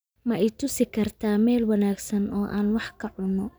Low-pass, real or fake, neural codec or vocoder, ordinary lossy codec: none; real; none; none